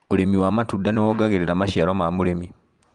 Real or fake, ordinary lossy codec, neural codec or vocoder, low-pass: fake; Opus, 32 kbps; vocoder, 24 kHz, 100 mel bands, Vocos; 10.8 kHz